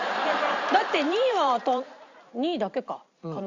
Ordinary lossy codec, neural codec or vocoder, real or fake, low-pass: Opus, 64 kbps; vocoder, 44.1 kHz, 128 mel bands every 512 samples, BigVGAN v2; fake; 7.2 kHz